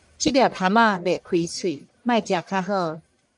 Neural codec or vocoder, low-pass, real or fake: codec, 44.1 kHz, 1.7 kbps, Pupu-Codec; 10.8 kHz; fake